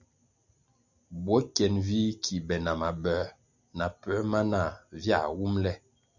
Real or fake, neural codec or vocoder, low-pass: real; none; 7.2 kHz